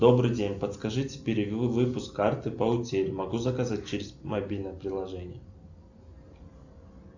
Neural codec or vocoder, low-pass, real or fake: none; 7.2 kHz; real